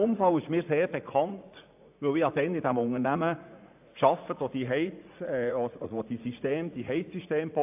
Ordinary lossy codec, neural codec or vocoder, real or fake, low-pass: AAC, 32 kbps; vocoder, 44.1 kHz, 80 mel bands, Vocos; fake; 3.6 kHz